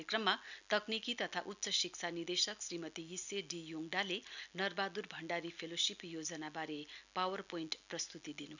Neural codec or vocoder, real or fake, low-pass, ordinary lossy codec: none; real; 7.2 kHz; none